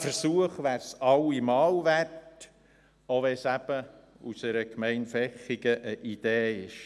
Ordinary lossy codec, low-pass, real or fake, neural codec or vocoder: none; none; real; none